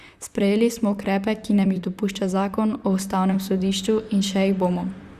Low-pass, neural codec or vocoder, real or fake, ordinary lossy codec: 14.4 kHz; vocoder, 44.1 kHz, 128 mel bands, Pupu-Vocoder; fake; Opus, 64 kbps